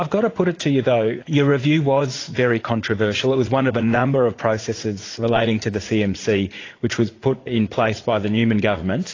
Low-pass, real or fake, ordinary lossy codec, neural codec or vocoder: 7.2 kHz; fake; AAC, 32 kbps; vocoder, 44.1 kHz, 80 mel bands, Vocos